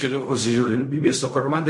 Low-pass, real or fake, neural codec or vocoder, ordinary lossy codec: 10.8 kHz; fake; codec, 16 kHz in and 24 kHz out, 0.4 kbps, LongCat-Audio-Codec, fine tuned four codebook decoder; MP3, 48 kbps